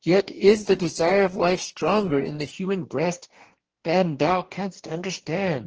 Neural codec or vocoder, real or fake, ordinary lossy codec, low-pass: codec, 44.1 kHz, 2.6 kbps, DAC; fake; Opus, 16 kbps; 7.2 kHz